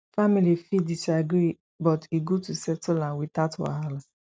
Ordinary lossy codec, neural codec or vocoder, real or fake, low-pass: none; none; real; none